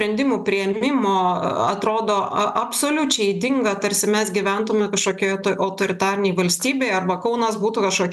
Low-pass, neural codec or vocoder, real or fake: 14.4 kHz; none; real